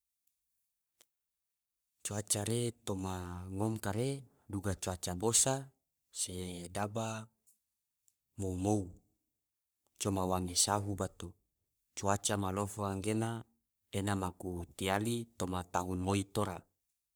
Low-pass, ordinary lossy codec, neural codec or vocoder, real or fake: none; none; codec, 44.1 kHz, 3.4 kbps, Pupu-Codec; fake